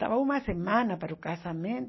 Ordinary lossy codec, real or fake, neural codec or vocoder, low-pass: MP3, 24 kbps; real; none; 7.2 kHz